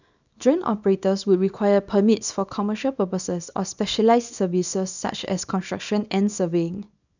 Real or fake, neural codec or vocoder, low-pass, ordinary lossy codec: fake; codec, 24 kHz, 0.9 kbps, WavTokenizer, small release; 7.2 kHz; none